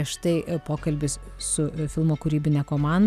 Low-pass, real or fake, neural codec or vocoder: 14.4 kHz; real; none